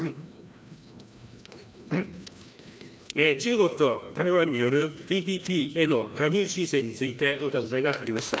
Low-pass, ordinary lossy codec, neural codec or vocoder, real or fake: none; none; codec, 16 kHz, 1 kbps, FreqCodec, larger model; fake